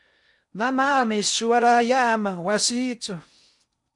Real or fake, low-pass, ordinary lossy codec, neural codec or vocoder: fake; 10.8 kHz; MP3, 96 kbps; codec, 16 kHz in and 24 kHz out, 0.6 kbps, FocalCodec, streaming, 4096 codes